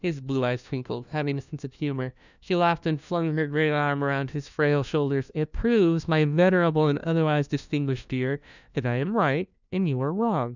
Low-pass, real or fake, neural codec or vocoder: 7.2 kHz; fake; codec, 16 kHz, 1 kbps, FunCodec, trained on LibriTTS, 50 frames a second